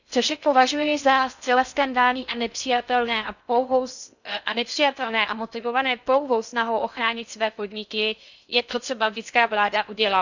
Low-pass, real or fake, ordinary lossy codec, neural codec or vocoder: 7.2 kHz; fake; none; codec, 16 kHz in and 24 kHz out, 0.6 kbps, FocalCodec, streaming, 4096 codes